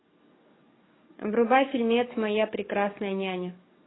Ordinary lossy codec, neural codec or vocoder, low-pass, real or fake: AAC, 16 kbps; codec, 44.1 kHz, 7.8 kbps, DAC; 7.2 kHz; fake